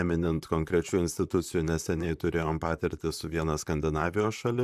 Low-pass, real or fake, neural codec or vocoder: 14.4 kHz; fake; vocoder, 44.1 kHz, 128 mel bands, Pupu-Vocoder